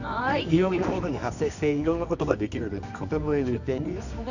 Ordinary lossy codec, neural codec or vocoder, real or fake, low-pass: none; codec, 24 kHz, 0.9 kbps, WavTokenizer, medium music audio release; fake; 7.2 kHz